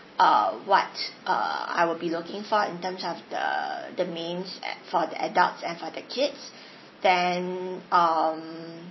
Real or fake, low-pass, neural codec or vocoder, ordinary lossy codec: real; 7.2 kHz; none; MP3, 24 kbps